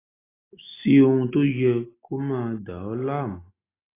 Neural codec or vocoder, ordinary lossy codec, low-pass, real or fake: none; AAC, 16 kbps; 3.6 kHz; real